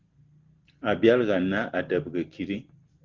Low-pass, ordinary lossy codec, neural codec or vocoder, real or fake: 7.2 kHz; Opus, 16 kbps; none; real